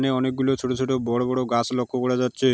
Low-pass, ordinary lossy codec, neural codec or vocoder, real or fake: none; none; none; real